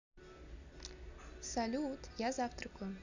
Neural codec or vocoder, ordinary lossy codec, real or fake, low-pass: none; none; real; 7.2 kHz